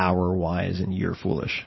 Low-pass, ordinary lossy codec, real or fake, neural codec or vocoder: 7.2 kHz; MP3, 24 kbps; fake; vocoder, 44.1 kHz, 128 mel bands every 512 samples, BigVGAN v2